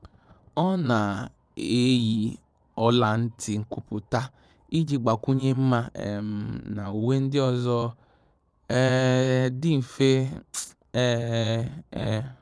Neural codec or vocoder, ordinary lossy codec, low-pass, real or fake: vocoder, 22.05 kHz, 80 mel bands, Vocos; none; none; fake